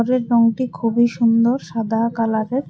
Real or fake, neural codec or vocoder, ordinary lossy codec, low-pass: real; none; none; none